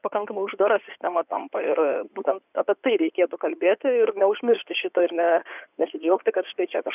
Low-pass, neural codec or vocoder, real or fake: 3.6 kHz; codec, 16 kHz in and 24 kHz out, 2.2 kbps, FireRedTTS-2 codec; fake